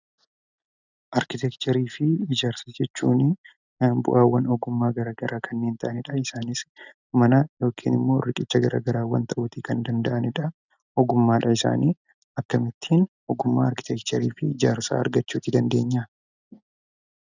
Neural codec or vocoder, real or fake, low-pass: none; real; 7.2 kHz